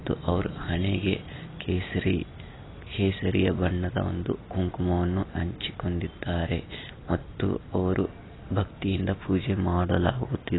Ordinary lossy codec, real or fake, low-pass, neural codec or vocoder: AAC, 16 kbps; real; 7.2 kHz; none